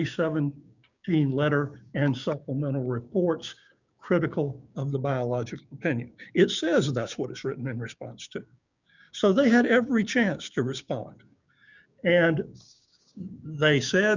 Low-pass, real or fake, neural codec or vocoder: 7.2 kHz; fake; codec, 16 kHz, 6 kbps, DAC